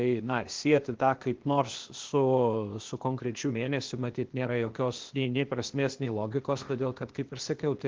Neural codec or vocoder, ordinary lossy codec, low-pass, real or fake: codec, 16 kHz, 0.8 kbps, ZipCodec; Opus, 16 kbps; 7.2 kHz; fake